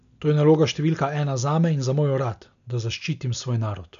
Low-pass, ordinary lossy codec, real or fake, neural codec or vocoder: 7.2 kHz; none; real; none